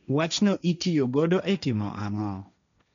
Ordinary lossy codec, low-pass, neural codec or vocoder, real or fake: none; 7.2 kHz; codec, 16 kHz, 1.1 kbps, Voila-Tokenizer; fake